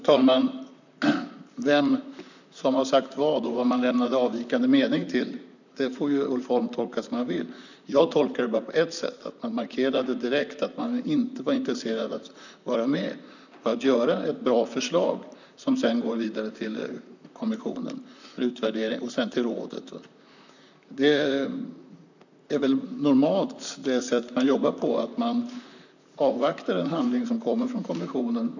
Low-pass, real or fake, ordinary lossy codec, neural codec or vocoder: 7.2 kHz; fake; none; vocoder, 44.1 kHz, 128 mel bands, Pupu-Vocoder